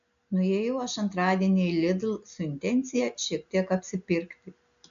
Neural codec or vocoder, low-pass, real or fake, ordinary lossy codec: none; 7.2 kHz; real; AAC, 96 kbps